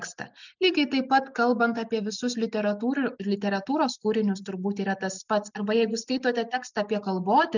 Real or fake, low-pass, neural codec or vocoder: real; 7.2 kHz; none